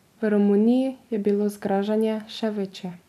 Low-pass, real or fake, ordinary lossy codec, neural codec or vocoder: 14.4 kHz; real; none; none